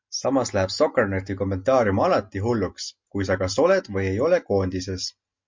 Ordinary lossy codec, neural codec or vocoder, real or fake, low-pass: MP3, 48 kbps; none; real; 7.2 kHz